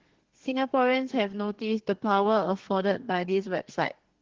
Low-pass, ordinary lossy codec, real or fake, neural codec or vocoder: 7.2 kHz; Opus, 16 kbps; fake; codec, 32 kHz, 1.9 kbps, SNAC